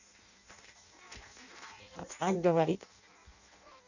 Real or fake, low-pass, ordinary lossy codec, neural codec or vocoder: fake; 7.2 kHz; none; codec, 16 kHz in and 24 kHz out, 0.6 kbps, FireRedTTS-2 codec